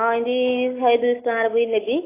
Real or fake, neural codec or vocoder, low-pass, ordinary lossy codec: real; none; 3.6 kHz; AAC, 24 kbps